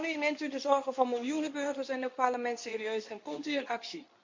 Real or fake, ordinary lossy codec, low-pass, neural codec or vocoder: fake; MP3, 48 kbps; 7.2 kHz; codec, 24 kHz, 0.9 kbps, WavTokenizer, medium speech release version 2